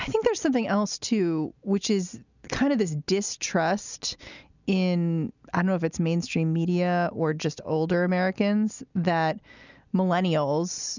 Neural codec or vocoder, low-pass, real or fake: none; 7.2 kHz; real